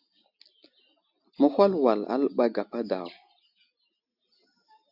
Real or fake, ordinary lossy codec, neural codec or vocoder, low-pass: real; AAC, 48 kbps; none; 5.4 kHz